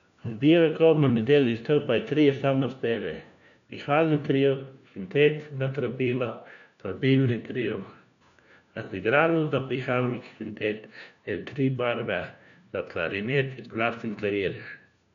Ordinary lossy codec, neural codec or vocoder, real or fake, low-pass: none; codec, 16 kHz, 1 kbps, FunCodec, trained on LibriTTS, 50 frames a second; fake; 7.2 kHz